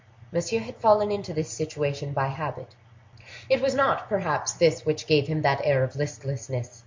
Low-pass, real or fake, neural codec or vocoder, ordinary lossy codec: 7.2 kHz; real; none; MP3, 48 kbps